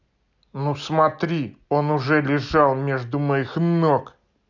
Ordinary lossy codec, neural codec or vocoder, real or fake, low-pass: none; none; real; 7.2 kHz